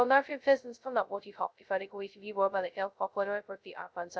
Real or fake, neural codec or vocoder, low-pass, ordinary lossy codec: fake; codec, 16 kHz, 0.2 kbps, FocalCodec; none; none